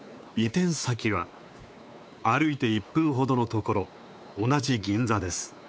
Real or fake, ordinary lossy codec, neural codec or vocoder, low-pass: fake; none; codec, 16 kHz, 4 kbps, X-Codec, WavLM features, trained on Multilingual LibriSpeech; none